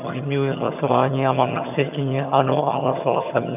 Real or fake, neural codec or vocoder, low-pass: fake; vocoder, 22.05 kHz, 80 mel bands, HiFi-GAN; 3.6 kHz